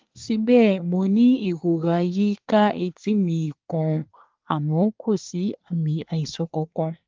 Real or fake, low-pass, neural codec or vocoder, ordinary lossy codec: fake; 7.2 kHz; codec, 24 kHz, 1 kbps, SNAC; Opus, 32 kbps